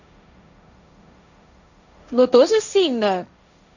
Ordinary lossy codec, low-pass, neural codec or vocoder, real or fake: none; 7.2 kHz; codec, 16 kHz, 1.1 kbps, Voila-Tokenizer; fake